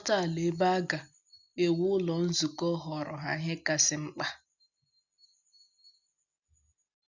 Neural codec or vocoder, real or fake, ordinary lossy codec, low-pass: none; real; none; 7.2 kHz